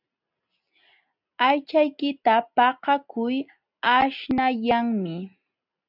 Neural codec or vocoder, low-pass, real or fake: none; 5.4 kHz; real